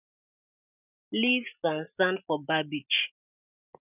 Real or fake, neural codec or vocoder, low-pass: real; none; 3.6 kHz